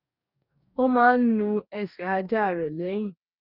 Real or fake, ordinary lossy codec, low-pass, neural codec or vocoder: fake; none; 5.4 kHz; codec, 44.1 kHz, 2.6 kbps, DAC